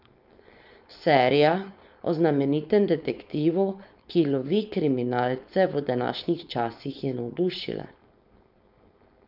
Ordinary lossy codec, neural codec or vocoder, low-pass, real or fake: none; codec, 16 kHz, 4.8 kbps, FACodec; 5.4 kHz; fake